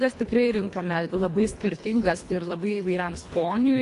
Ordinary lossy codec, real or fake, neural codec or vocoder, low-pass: AAC, 48 kbps; fake; codec, 24 kHz, 1.5 kbps, HILCodec; 10.8 kHz